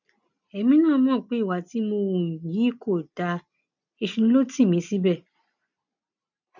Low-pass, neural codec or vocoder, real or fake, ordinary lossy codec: 7.2 kHz; none; real; none